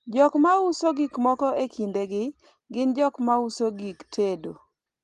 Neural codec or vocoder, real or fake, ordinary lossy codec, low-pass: none; real; Opus, 24 kbps; 10.8 kHz